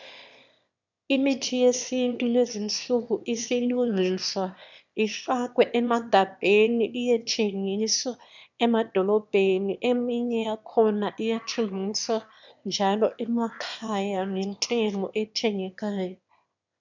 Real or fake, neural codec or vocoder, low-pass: fake; autoencoder, 22.05 kHz, a latent of 192 numbers a frame, VITS, trained on one speaker; 7.2 kHz